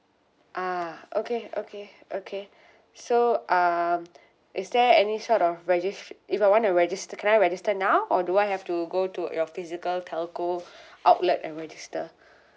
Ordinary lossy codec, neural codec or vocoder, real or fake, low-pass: none; none; real; none